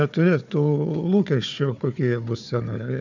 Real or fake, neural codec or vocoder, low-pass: fake; codec, 16 kHz, 4 kbps, FunCodec, trained on Chinese and English, 50 frames a second; 7.2 kHz